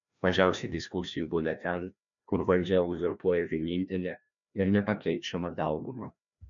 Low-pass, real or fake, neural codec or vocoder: 7.2 kHz; fake; codec, 16 kHz, 1 kbps, FreqCodec, larger model